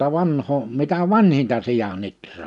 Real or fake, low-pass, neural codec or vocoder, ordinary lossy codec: real; 10.8 kHz; none; none